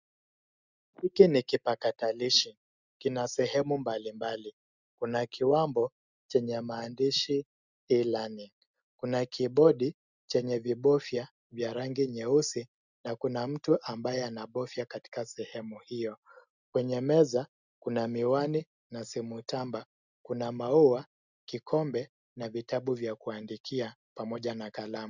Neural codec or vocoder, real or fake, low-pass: none; real; 7.2 kHz